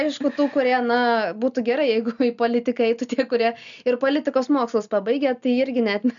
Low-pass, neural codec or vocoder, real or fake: 7.2 kHz; none; real